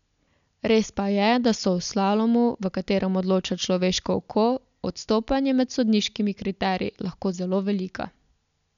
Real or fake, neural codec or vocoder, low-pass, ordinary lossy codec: real; none; 7.2 kHz; none